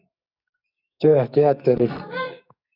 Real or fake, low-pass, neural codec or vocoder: fake; 5.4 kHz; codec, 44.1 kHz, 2.6 kbps, SNAC